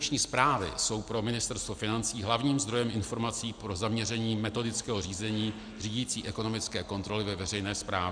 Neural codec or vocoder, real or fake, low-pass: none; real; 9.9 kHz